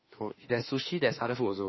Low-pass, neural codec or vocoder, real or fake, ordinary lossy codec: 7.2 kHz; autoencoder, 48 kHz, 32 numbers a frame, DAC-VAE, trained on Japanese speech; fake; MP3, 24 kbps